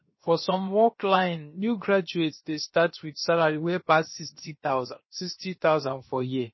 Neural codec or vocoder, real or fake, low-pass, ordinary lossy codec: codec, 16 kHz, 0.7 kbps, FocalCodec; fake; 7.2 kHz; MP3, 24 kbps